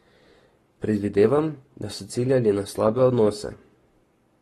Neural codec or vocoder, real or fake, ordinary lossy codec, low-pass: codec, 44.1 kHz, 7.8 kbps, Pupu-Codec; fake; AAC, 32 kbps; 19.8 kHz